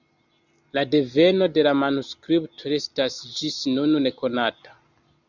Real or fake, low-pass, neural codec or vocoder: real; 7.2 kHz; none